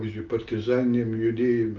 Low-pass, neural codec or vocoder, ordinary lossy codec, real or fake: 7.2 kHz; none; Opus, 32 kbps; real